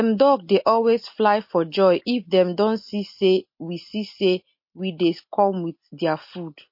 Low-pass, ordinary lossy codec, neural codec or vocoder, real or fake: 5.4 kHz; MP3, 32 kbps; none; real